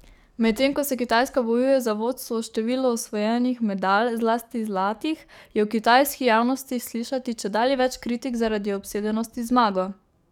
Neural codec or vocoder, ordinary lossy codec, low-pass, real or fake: codec, 44.1 kHz, 7.8 kbps, DAC; none; 19.8 kHz; fake